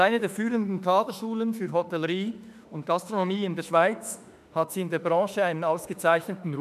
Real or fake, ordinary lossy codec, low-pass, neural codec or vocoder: fake; none; 14.4 kHz; autoencoder, 48 kHz, 32 numbers a frame, DAC-VAE, trained on Japanese speech